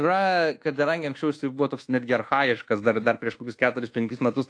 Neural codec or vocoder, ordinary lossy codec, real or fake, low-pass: codec, 24 kHz, 1.2 kbps, DualCodec; AAC, 48 kbps; fake; 9.9 kHz